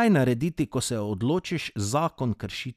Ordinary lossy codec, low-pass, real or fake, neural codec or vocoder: none; 14.4 kHz; real; none